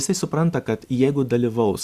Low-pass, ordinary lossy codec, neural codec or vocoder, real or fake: 14.4 kHz; Opus, 64 kbps; none; real